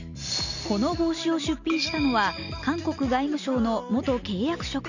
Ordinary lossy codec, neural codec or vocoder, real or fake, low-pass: none; none; real; 7.2 kHz